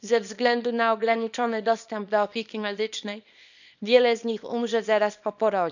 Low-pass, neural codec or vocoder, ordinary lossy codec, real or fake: 7.2 kHz; codec, 24 kHz, 0.9 kbps, WavTokenizer, small release; none; fake